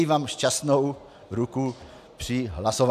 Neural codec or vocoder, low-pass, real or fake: none; 14.4 kHz; real